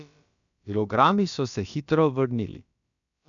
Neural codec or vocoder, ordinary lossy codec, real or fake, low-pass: codec, 16 kHz, about 1 kbps, DyCAST, with the encoder's durations; none; fake; 7.2 kHz